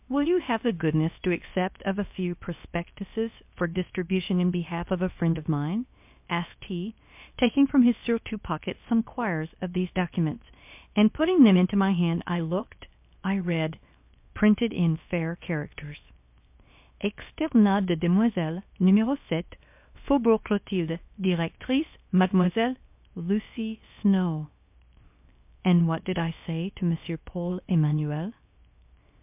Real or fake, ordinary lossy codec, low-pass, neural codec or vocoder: fake; MP3, 32 kbps; 3.6 kHz; codec, 16 kHz, 0.7 kbps, FocalCodec